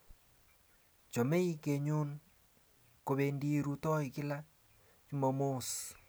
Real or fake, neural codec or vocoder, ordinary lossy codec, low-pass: real; none; none; none